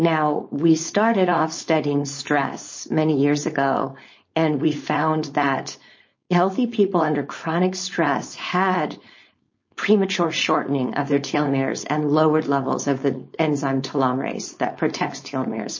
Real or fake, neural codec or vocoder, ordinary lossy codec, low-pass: fake; codec, 16 kHz, 4.8 kbps, FACodec; MP3, 32 kbps; 7.2 kHz